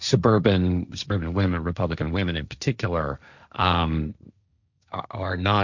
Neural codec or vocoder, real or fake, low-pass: codec, 16 kHz, 1.1 kbps, Voila-Tokenizer; fake; 7.2 kHz